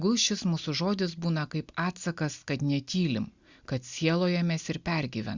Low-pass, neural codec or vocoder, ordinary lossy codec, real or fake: 7.2 kHz; none; Opus, 64 kbps; real